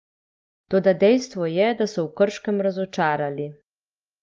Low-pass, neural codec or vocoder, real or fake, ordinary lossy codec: 7.2 kHz; none; real; Opus, 24 kbps